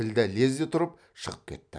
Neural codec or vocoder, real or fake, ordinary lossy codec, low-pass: none; real; none; 9.9 kHz